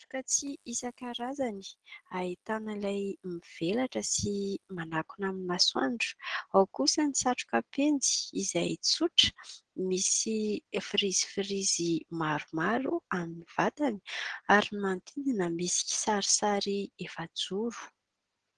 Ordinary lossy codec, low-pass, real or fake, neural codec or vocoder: Opus, 16 kbps; 10.8 kHz; real; none